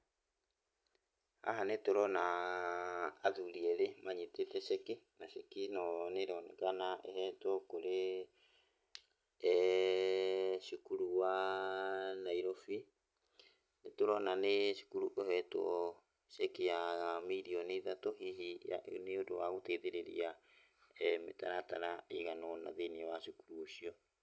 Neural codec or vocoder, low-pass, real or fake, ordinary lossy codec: none; none; real; none